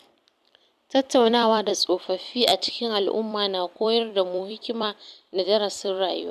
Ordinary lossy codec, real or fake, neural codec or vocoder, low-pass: none; fake; vocoder, 44.1 kHz, 128 mel bands every 256 samples, BigVGAN v2; 14.4 kHz